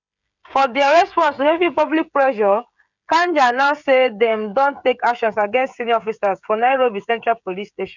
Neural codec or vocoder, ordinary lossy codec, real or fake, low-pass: codec, 16 kHz, 16 kbps, FreqCodec, smaller model; none; fake; 7.2 kHz